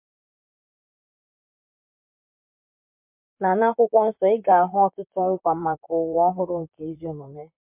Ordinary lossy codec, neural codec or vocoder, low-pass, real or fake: MP3, 32 kbps; vocoder, 44.1 kHz, 128 mel bands, Pupu-Vocoder; 3.6 kHz; fake